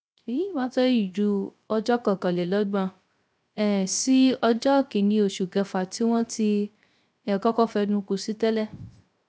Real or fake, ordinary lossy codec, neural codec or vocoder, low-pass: fake; none; codec, 16 kHz, 0.3 kbps, FocalCodec; none